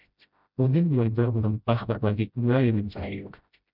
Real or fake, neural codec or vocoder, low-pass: fake; codec, 16 kHz, 0.5 kbps, FreqCodec, smaller model; 5.4 kHz